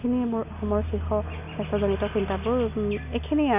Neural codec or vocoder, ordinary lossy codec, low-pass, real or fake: none; none; 3.6 kHz; real